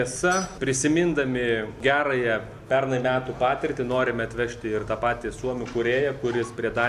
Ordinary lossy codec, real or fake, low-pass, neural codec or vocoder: Opus, 64 kbps; real; 14.4 kHz; none